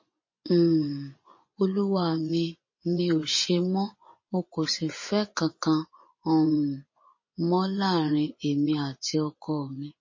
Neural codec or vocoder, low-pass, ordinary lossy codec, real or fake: vocoder, 24 kHz, 100 mel bands, Vocos; 7.2 kHz; MP3, 32 kbps; fake